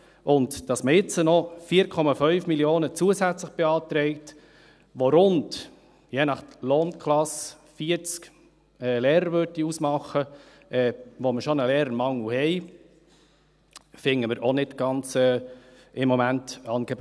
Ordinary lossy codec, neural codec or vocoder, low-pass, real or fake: none; none; none; real